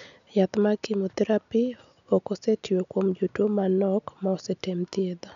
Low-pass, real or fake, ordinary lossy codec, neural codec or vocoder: 7.2 kHz; real; none; none